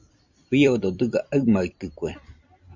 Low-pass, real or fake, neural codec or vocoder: 7.2 kHz; fake; vocoder, 44.1 kHz, 128 mel bands every 256 samples, BigVGAN v2